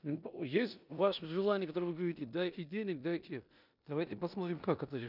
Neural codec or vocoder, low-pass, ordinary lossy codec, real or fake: codec, 16 kHz in and 24 kHz out, 0.9 kbps, LongCat-Audio-Codec, four codebook decoder; 5.4 kHz; none; fake